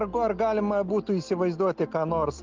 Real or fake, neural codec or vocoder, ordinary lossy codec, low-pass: real; none; Opus, 32 kbps; 7.2 kHz